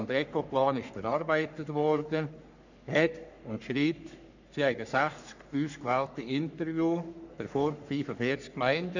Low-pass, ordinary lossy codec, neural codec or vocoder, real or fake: 7.2 kHz; none; codec, 44.1 kHz, 3.4 kbps, Pupu-Codec; fake